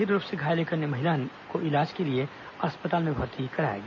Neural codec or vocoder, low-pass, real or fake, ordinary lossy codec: none; 7.2 kHz; real; none